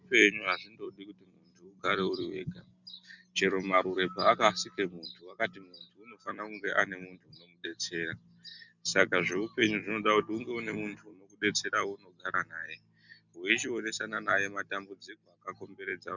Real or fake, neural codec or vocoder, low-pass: real; none; 7.2 kHz